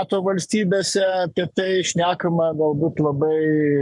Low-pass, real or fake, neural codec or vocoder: 10.8 kHz; fake; codec, 44.1 kHz, 7.8 kbps, Pupu-Codec